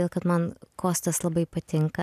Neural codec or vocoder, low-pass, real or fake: none; 14.4 kHz; real